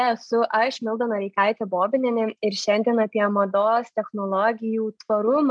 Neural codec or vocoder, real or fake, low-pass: none; real; 9.9 kHz